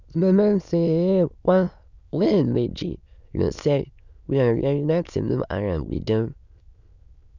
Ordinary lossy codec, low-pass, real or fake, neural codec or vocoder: none; 7.2 kHz; fake; autoencoder, 22.05 kHz, a latent of 192 numbers a frame, VITS, trained on many speakers